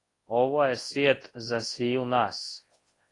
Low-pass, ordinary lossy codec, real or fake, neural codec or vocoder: 10.8 kHz; AAC, 32 kbps; fake; codec, 24 kHz, 0.9 kbps, WavTokenizer, large speech release